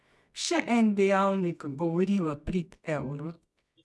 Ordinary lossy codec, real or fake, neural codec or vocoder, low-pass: none; fake; codec, 24 kHz, 0.9 kbps, WavTokenizer, medium music audio release; none